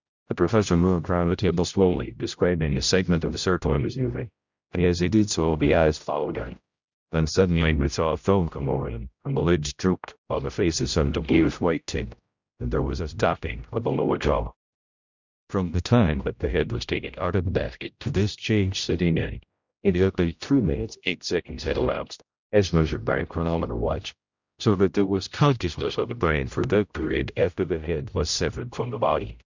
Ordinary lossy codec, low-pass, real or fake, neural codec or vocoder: Opus, 64 kbps; 7.2 kHz; fake; codec, 16 kHz, 0.5 kbps, X-Codec, HuBERT features, trained on general audio